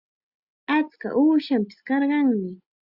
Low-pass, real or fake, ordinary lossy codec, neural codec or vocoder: 5.4 kHz; real; Opus, 64 kbps; none